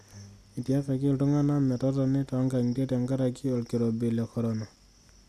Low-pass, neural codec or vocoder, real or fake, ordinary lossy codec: 14.4 kHz; none; real; none